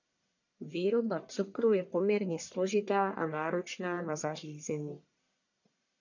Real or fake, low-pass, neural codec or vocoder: fake; 7.2 kHz; codec, 44.1 kHz, 1.7 kbps, Pupu-Codec